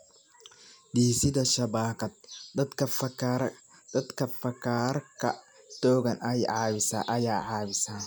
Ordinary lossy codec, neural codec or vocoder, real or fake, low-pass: none; none; real; none